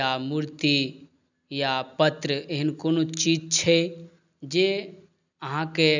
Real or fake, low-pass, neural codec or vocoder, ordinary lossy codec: real; 7.2 kHz; none; none